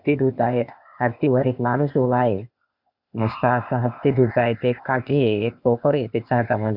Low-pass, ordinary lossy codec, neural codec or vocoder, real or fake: 5.4 kHz; none; codec, 16 kHz, 0.8 kbps, ZipCodec; fake